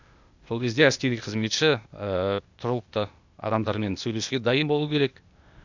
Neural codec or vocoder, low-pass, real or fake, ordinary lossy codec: codec, 16 kHz, 0.8 kbps, ZipCodec; 7.2 kHz; fake; none